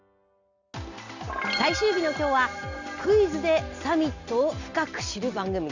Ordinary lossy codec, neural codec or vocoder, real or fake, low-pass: none; none; real; 7.2 kHz